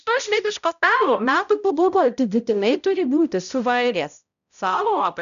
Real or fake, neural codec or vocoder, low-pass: fake; codec, 16 kHz, 0.5 kbps, X-Codec, HuBERT features, trained on balanced general audio; 7.2 kHz